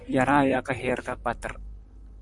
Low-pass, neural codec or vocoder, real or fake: 10.8 kHz; vocoder, 44.1 kHz, 128 mel bands, Pupu-Vocoder; fake